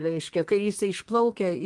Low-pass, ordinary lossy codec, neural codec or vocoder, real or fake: 10.8 kHz; Opus, 24 kbps; codec, 32 kHz, 1.9 kbps, SNAC; fake